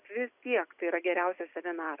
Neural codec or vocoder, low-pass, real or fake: none; 3.6 kHz; real